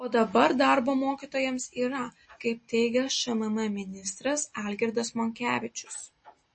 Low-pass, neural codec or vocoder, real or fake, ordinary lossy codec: 9.9 kHz; none; real; MP3, 32 kbps